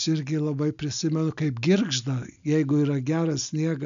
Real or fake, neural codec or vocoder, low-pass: real; none; 7.2 kHz